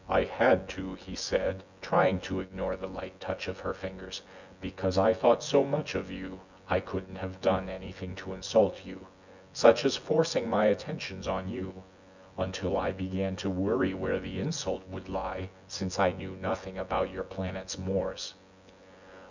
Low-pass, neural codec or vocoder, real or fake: 7.2 kHz; vocoder, 24 kHz, 100 mel bands, Vocos; fake